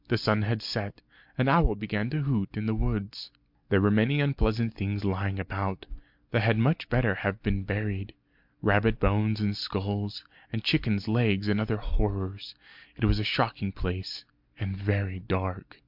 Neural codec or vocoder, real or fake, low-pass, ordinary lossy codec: none; real; 5.4 kHz; MP3, 48 kbps